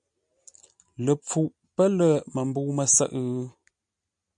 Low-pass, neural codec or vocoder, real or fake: 9.9 kHz; none; real